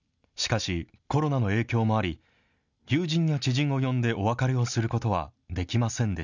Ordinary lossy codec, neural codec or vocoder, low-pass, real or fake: none; none; 7.2 kHz; real